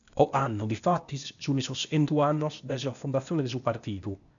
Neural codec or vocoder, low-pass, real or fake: codec, 16 kHz, 0.8 kbps, ZipCodec; 7.2 kHz; fake